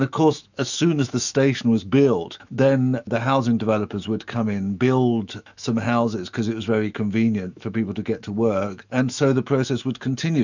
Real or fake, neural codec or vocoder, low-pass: fake; autoencoder, 48 kHz, 128 numbers a frame, DAC-VAE, trained on Japanese speech; 7.2 kHz